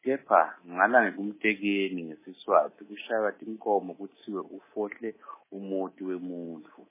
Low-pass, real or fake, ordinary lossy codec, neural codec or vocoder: 3.6 kHz; fake; MP3, 16 kbps; codec, 24 kHz, 3.1 kbps, DualCodec